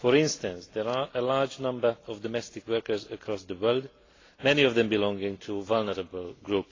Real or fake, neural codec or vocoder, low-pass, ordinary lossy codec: real; none; 7.2 kHz; AAC, 32 kbps